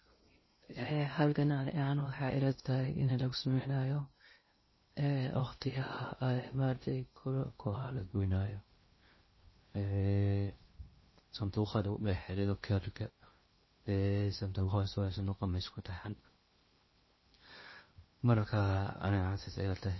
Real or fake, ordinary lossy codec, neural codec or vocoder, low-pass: fake; MP3, 24 kbps; codec, 16 kHz in and 24 kHz out, 0.6 kbps, FocalCodec, streaming, 2048 codes; 7.2 kHz